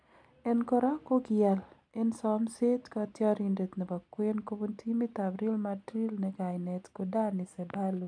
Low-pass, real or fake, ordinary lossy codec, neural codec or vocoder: 9.9 kHz; real; none; none